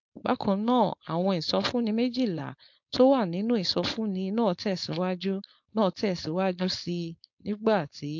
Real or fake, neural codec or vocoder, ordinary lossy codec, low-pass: fake; codec, 16 kHz, 4.8 kbps, FACodec; MP3, 48 kbps; 7.2 kHz